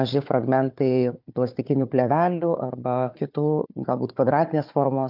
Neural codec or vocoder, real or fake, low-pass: codec, 16 kHz, 4 kbps, FunCodec, trained on Chinese and English, 50 frames a second; fake; 5.4 kHz